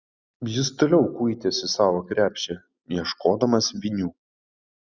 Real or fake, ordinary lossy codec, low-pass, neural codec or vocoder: real; Opus, 64 kbps; 7.2 kHz; none